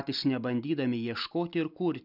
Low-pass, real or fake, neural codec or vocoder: 5.4 kHz; real; none